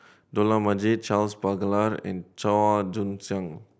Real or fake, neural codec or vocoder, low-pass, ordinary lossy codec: real; none; none; none